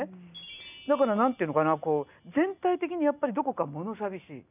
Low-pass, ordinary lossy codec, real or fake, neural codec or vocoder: 3.6 kHz; none; real; none